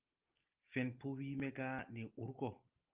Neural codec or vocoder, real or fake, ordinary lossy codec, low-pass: none; real; Opus, 24 kbps; 3.6 kHz